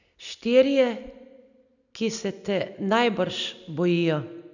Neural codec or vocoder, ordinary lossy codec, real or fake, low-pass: none; none; real; 7.2 kHz